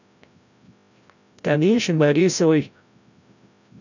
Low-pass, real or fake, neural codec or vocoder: 7.2 kHz; fake; codec, 16 kHz, 0.5 kbps, FreqCodec, larger model